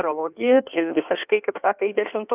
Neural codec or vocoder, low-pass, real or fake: codec, 16 kHz in and 24 kHz out, 1.1 kbps, FireRedTTS-2 codec; 3.6 kHz; fake